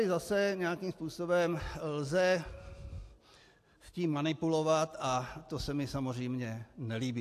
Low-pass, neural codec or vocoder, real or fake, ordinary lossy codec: 14.4 kHz; none; real; AAC, 64 kbps